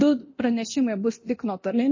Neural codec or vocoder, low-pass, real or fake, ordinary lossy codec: codec, 24 kHz, 0.9 kbps, DualCodec; 7.2 kHz; fake; MP3, 32 kbps